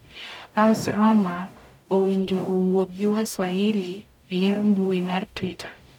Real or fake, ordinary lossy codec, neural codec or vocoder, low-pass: fake; none; codec, 44.1 kHz, 0.9 kbps, DAC; 19.8 kHz